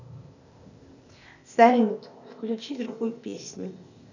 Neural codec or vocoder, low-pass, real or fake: codec, 16 kHz, 0.8 kbps, ZipCodec; 7.2 kHz; fake